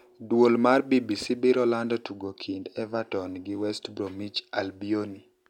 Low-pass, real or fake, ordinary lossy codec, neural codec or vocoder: 19.8 kHz; real; none; none